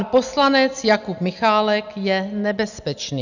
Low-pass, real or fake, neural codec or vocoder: 7.2 kHz; real; none